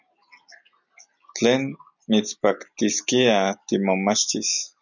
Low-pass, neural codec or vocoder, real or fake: 7.2 kHz; none; real